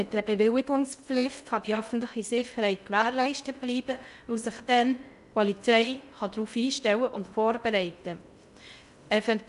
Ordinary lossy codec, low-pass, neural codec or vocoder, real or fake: none; 10.8 kHz; codec, 16 kHz in and 24 kHz out, 0.6 kbps, FocalCodec, streaming, 2048 codes; fake